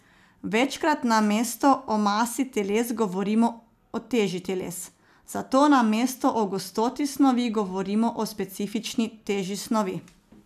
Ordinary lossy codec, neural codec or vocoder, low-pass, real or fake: none; none; 14.4 kHz; real